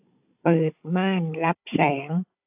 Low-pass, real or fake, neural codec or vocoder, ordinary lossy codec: 3.6 kHz; fake; codec, 16 kHz, 16 kbps, FunCodec, trained on Chinese and English, 50 frames a second; AAC, 32 kbps